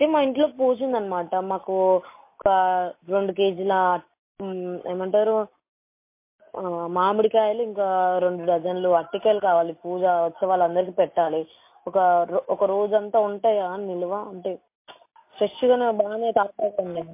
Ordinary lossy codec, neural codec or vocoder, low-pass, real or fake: MP3, 24 kbps; none; 3.6 kHz; real